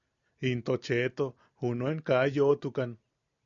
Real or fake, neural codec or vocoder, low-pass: real; none; 7.2 kHz